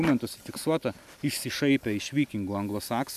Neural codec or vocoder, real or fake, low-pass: none; real; 14.4 kHz